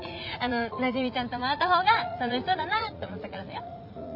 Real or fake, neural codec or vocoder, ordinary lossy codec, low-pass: fake; vocoder, 44.1 kHz, 80 mel bands, Vocos; none; 5.4 kHz